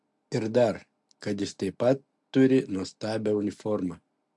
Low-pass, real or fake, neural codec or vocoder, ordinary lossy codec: 10.8 kHz; real; none; MP3, 64 kbps